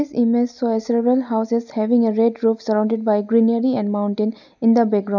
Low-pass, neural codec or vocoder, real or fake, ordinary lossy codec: 7.2 kHz; none; real; none